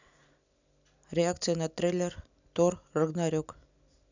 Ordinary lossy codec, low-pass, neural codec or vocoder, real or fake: none; 7.2 kHz; none; real